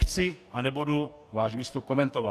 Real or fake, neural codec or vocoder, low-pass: fake; codec, 44.1 kHz, 2.6 kbps, DAC; 14.4 kHz